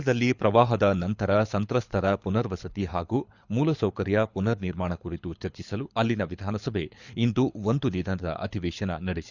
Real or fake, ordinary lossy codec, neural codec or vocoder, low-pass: fake; Opus, 64 kbps; codec, 24 kHz, 6 kbps, HILCodec; 7.2 kHz